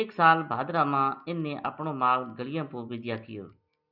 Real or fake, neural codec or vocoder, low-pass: real; none; 5.4 kHz